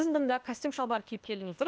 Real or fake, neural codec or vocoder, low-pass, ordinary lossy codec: fake; codec, 16 kHz, 0.8 kbps, ZipCodec; none; none